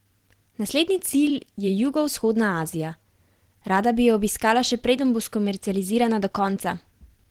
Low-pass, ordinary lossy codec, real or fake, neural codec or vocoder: 19.8 kHz; Opus, 16 kbps; fake; vocoder, 44.1 kHz, 128 mel bands every 512 samples, BigVGAN v2